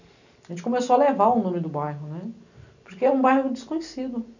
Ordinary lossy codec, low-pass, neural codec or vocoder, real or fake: none; 7.2 kHz; none; real